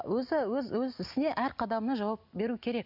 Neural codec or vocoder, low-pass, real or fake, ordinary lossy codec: none; 5.4 kHz; real; none